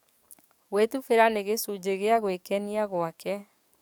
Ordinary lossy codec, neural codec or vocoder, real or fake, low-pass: none; codec, 44.1 kHz, 7.8 kbps, DAC; fake; none